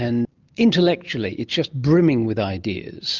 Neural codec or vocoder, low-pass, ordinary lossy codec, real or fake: none; 7.2 kHz; Opus, 24 kbps; real